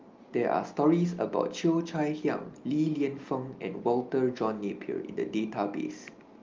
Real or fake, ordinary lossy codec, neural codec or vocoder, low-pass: real; Opus, 24 kbps; none; 7.2 kHz